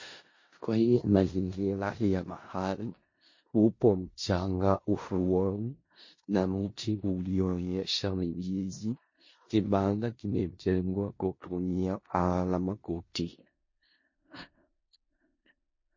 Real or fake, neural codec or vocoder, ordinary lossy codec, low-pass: fake; codec, 16 kHz in and 24 kHz out, 0.4 kbps, LongCat-Audio-Codec, four codebook decoder; MP3, 32 kbps; 7.2 kHz